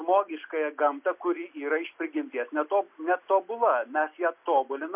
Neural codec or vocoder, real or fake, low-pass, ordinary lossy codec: none; real; 3.6 kHz; MP3, 32 kbps